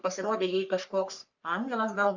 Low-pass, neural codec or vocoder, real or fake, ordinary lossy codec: 7.2 kHz; codec, 44.1 kHz, 3.4 kbps, Pupu-Codec; fake; Opus, 64 kbps